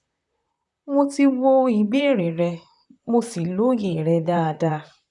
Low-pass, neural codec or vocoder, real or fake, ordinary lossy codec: 10.8 kHz; vocoder, 44.1 kHz, 128 mel bands, Pupu-Vocoder; fake; none